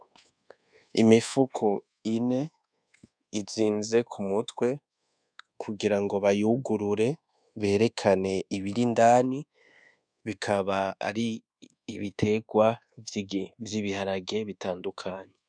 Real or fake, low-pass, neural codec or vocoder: fake; 9.9 kHz; codec, 24 kHz, 1.2 kbps, DualCodec